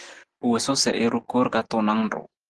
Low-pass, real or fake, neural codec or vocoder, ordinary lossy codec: 10.8 kHz; real; none; Opus, 16 kbps